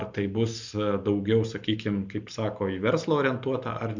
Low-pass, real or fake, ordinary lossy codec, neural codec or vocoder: 7.2 kHz; real; MP3, 64 kbps; none